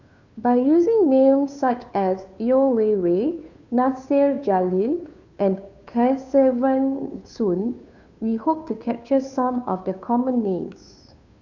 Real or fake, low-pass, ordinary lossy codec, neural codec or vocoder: fake; 7.2 kHz; none; codec, 16 kHz, 2 kbps, FunCodec, trained on Chinese and English, 25 frames a second